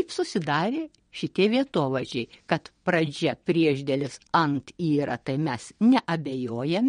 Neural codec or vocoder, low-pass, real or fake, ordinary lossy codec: vocoder, 22.05 kHz, 80 mel bands, WaveNeXt; 9.9 kHz; fake; MP3, 48 kbps